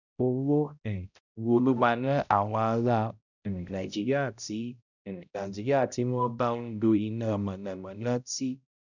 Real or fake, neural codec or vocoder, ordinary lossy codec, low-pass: fake; codec, 16 kHz, 0.5 kbps, X-Codec, HuBERT features, trained on balanced general audio; none; 7.2 kHz